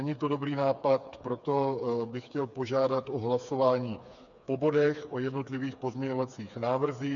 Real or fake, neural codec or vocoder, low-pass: fake; codec, 16 kHz, 4 kbps, FreqCodec, smaller model; 7.2 kHz